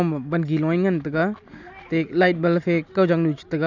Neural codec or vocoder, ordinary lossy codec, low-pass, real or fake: none; none; 7.2 kHz; real